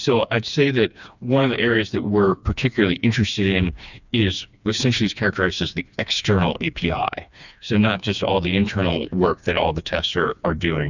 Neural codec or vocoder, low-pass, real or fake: codec, 16 kHz, 2 kbps, FreqCodec, smaller model; 7.2 kHz; fake